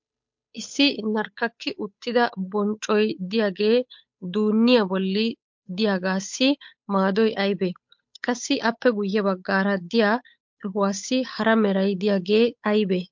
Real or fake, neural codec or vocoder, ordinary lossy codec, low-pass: fake; codec, 16 kHz, 8 kbps, FunCodec, trained on Chinese and English, 25 frames a second; MP3, 48 kbps; 7.2 kHz